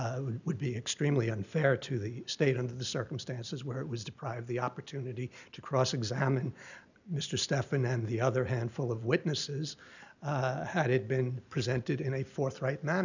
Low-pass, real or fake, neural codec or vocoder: 7.2 kHz; real; none